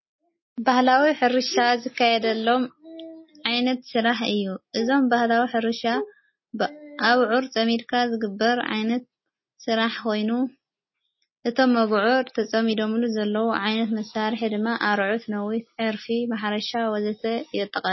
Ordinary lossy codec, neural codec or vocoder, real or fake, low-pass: MP3, 24 kbps; none; real; 7.2 kHz